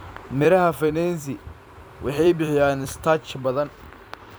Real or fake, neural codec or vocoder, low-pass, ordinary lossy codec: fake; vocoder, 44.1 kHz, 128 mel bands every 256 samples, BigVGAN v2; none; none